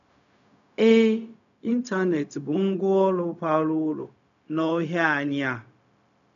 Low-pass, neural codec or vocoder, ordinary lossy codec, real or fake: 7.2 kHz; codec, 16 kHz, 0.4 kbps, LongCat-Audio-Codec; none; fake